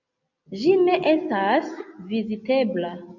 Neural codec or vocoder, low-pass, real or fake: none; 7.2 kHz; real